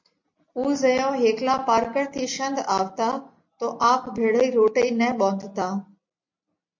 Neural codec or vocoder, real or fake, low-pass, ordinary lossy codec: none; real; 7.2 kHz; MP3, 48 kbps